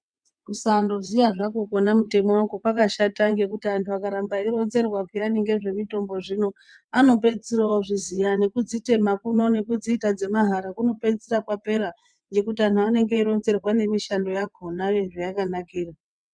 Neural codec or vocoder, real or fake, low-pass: vocoder, 22.05 kHz, 80 mel bands, WaveNeXt; fake; 9.9 kHz